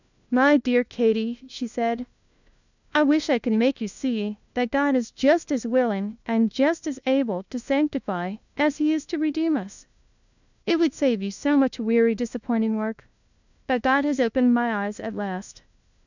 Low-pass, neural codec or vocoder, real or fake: 7.2 kHz; codec, 16 kHz, 1 kbps, FunCodec, trained on LibriTTS, 50 frames a second; fake